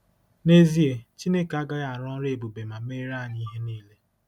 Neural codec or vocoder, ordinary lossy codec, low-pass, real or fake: none; none; 19.8 kHz; real